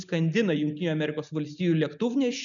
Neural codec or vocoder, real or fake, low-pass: none; real; 7.2 kHz